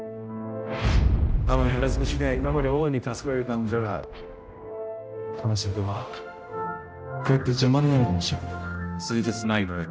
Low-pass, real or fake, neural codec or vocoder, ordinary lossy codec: none; fake; codec, 16 kHz, 0.5 kbps, X-Codec, HuBERT features, trained on general audio; none